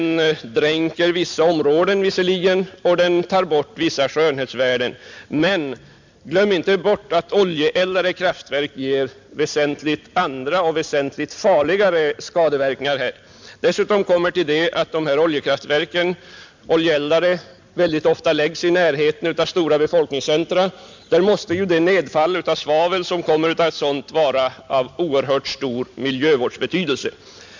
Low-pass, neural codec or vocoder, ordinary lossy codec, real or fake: 7.2 kHz; none; MP3, 64 kbps; real